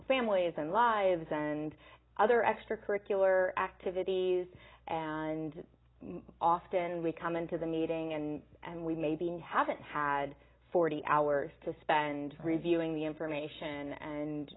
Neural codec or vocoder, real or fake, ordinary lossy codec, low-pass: none; real; AAC, 16 kbps; 7.2 kHz